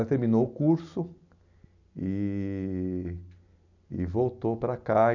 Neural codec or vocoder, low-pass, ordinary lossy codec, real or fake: none; 7.2 kHz; none; real